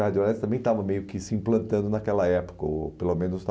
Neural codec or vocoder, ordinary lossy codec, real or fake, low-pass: none; none; real; none